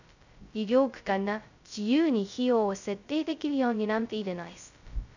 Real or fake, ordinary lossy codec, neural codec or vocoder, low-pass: fake; none; codec, 16 kHz, 0.2 kbps, FocalCodec; 7.2 kHz